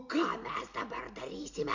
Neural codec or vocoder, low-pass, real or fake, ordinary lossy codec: none; 7.2 kHz; real; MP3, 48 kbps